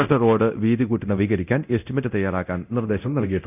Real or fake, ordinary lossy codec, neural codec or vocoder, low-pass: fake; none; codec, 24 kHz, 0.9 kbps, DualCodec; 3.6 kHz